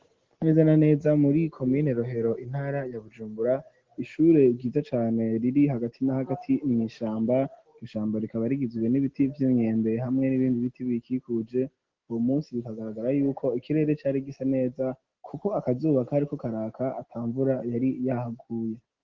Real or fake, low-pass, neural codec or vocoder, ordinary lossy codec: real; 7.2 kHz; none; Opus, 16 kbps